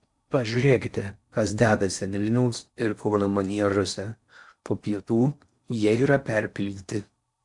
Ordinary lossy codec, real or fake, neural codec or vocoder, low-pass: MP3, 64 kbps; fake; codec, 16 kHz in and 24 kHz out, 0.6 kbps, FocalCodec, streaming, 4096 codes; 10.8 kHz